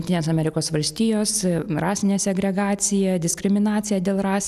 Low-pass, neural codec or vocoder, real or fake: 14.4 kHz; none; real